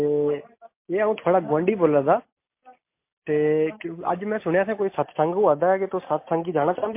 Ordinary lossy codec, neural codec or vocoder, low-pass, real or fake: MP3, 24 kbps; none; 3.6 kHz; real